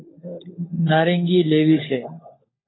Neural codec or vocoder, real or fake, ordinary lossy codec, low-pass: codec, 16 kHz, 16 kbps, FunCodec, trained on LibriTTS, 50 frames a second; fake; AAC, 16 kbps; 7.2 kHz